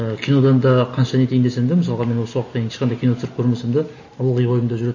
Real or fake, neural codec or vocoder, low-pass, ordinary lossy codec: real; none; 7.2 kHz; MP3, 32 kbps